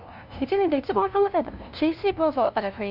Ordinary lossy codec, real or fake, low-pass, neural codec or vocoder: none; fake; 5.4 kHz; codec, 16 kHz, 0.5 kbps, FunCodec, trained on LibriTTS, 25 frames a second